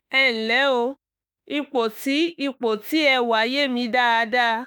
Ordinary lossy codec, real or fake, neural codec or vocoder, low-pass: none; fake; autoencoder, 48 kHz, 32 numbers a frame, DAC-VAE, trained on Japanese speech; none